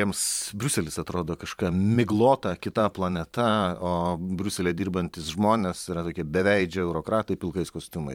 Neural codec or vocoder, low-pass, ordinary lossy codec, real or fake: vocoder, 44.1 kHz, 128 mel bands every 512 samples, BigVGAN v2; 19.8 kHz; MP3, 96 kbps; fake